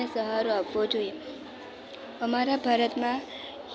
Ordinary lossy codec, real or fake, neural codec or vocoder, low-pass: none; real; none; none